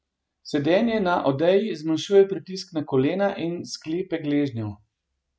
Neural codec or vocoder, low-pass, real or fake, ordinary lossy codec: none; none; real; none